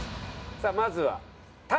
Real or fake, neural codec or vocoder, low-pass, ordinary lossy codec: real; none; none; none